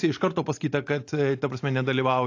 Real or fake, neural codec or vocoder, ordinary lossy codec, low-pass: real; none; AAC, 48 kbps; 7.2 kHz